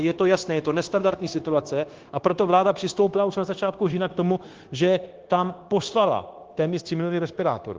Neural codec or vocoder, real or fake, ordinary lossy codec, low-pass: codec, 16 kHz, 0.9 kbps, LongCat-Audio-Codec; fake; Opus, 16 kbps; 7.2 kHz